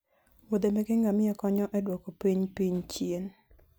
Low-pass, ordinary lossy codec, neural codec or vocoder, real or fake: none; none; none; real